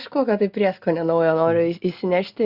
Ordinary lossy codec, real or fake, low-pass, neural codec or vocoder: Opus, 64 kbps; real; 5.4 kHz; none